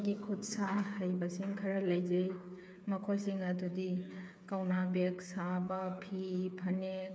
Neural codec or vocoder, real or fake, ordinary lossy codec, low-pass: codec, 16 kHz, 8 kbps, FreqCodec, smaller model; fake; none; none